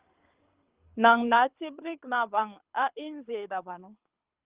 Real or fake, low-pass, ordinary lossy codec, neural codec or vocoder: fake; 3.6 kHz; Opus, 32 kbps; codec, 24 kHz, 6 kbps, HILCodec